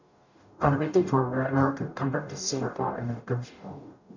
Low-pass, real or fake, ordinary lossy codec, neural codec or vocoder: 7.2 kHz; fake; none; codec, 44.1 kHz, 0.9 kbps, DAC